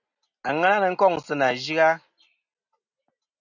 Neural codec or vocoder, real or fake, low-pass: none; real; 7.2 kHz